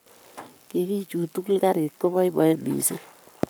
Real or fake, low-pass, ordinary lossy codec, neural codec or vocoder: fake; none; none; codec, 44.1 kHz, 7.8 kbps, Pupu-Codec